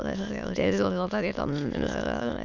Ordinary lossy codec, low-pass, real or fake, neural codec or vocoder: none; 7.2 kHz; fake; autoencoder, 22.05 kHz, a latent of 192 numbers a frame, VITS, trained on many speakers